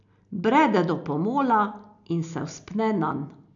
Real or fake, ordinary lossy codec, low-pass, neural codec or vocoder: real; none; 7.2 kHz; none